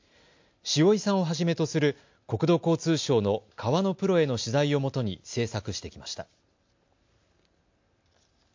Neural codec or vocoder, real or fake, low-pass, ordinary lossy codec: none; real; 7.2 kHz; MP3, 48 kbps